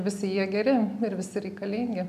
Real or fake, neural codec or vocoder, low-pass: real; none; 14.4 kHz